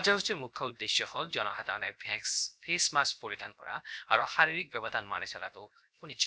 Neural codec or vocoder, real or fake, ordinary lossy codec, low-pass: codec, 16 kHz, 0.7 kbps, FocalCodec; fake; none; none